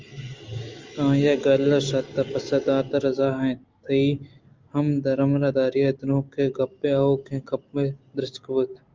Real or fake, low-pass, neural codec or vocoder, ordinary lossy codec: real; 7.2 kHz; none; Opus, 32 kbps